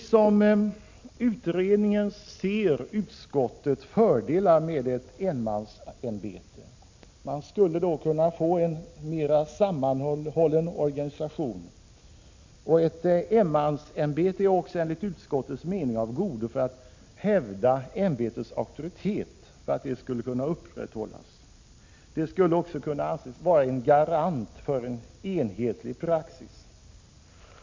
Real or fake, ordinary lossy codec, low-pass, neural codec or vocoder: real; MP3, 64 kbps; 7.2 kHz; none